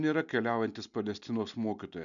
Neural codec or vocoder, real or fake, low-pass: none; real; 7.2 kHz